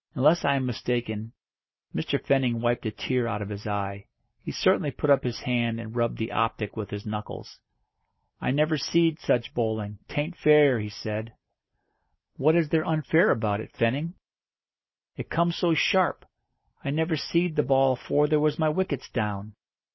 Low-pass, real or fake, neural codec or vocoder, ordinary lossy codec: 7.2 kHz; real; none; MP3, 24 kbps